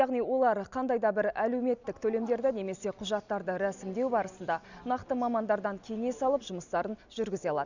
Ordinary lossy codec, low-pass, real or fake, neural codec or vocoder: none; 7.2 kHz; real; none